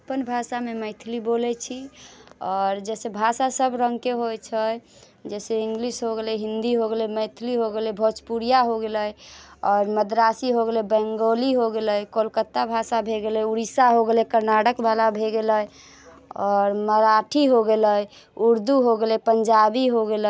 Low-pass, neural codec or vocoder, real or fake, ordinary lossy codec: none; none; real; none